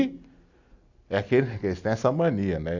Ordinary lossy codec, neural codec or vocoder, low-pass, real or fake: none; none; 7.2 kHz; real